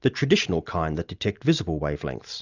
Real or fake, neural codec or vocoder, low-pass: real; none; 7.2 kHz